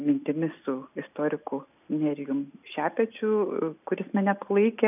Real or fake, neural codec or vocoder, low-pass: real; none; 3.6 kHz